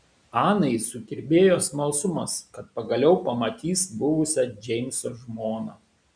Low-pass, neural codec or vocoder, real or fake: 9.9 kHz; none; real